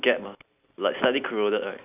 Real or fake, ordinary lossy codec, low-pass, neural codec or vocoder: real; none; 3.6 kHz; none